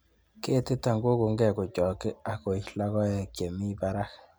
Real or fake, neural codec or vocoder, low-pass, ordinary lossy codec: real; none; none; none